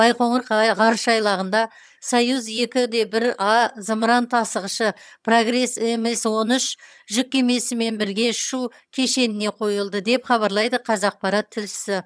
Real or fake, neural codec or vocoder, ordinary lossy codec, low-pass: fake; vocoder, 22.05 kHz, 80 mel bands, HiFi-GAN; none; none